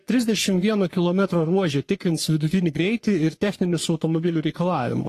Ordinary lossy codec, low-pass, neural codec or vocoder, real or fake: AAC, 48 kbps; 14.4 kHz; codec, 44.1 kHz, 3.4 kbps, Pupu-Codec; fake